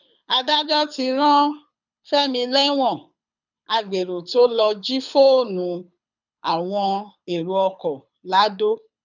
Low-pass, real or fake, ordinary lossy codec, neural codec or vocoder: 7.2 kHz; fake; none; codec, 24 kHz, 6 kbps, HILCodec